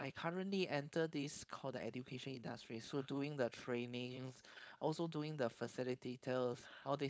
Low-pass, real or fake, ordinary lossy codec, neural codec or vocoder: none; fake; none; codec, 16 kHz, 4.8 kbps, FACodec